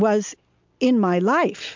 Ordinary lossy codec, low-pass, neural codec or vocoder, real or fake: MP3, 64 kbps; 7.2 kHz; none; real